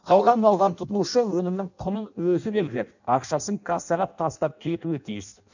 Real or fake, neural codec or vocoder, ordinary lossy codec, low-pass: fake; codec, 16 kHz in and 24 kHz out, 0.6 kbps, FireRedTTS-2 codec; none; 7.2 kHz